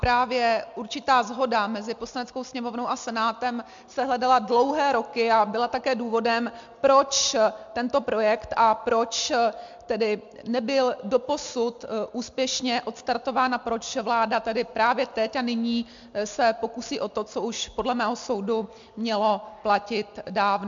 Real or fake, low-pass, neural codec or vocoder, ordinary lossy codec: real; 7.2 kHz; none; MP3, 64 kbps